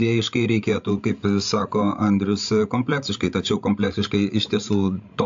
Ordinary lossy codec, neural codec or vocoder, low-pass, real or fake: AAC, 64 kbps; codec, 16 kHz, 16 kbps, FreqCodec, larger model; 7.2 kHz; fake